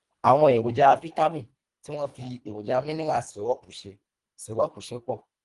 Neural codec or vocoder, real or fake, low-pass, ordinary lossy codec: codec, 24 kHz, 1.5 kbps, HILCodec; fake; 10.8 kHz; Opus, 32 kbps